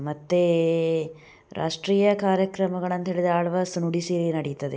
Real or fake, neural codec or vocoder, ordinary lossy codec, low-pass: real; none; none; none